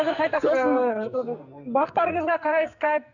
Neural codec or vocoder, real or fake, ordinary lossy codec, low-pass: codec, 44.1 kHz, 2.6 kbps, SNAC; fake; none; 7.2 kHz